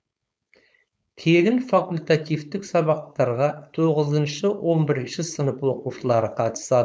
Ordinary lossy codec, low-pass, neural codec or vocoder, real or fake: none; none; codec, 16 kHz, 4.8 kbps, FACodec; fake